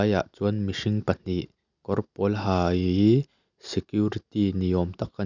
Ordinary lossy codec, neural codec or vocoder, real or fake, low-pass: none; none; real; 7.2 kHz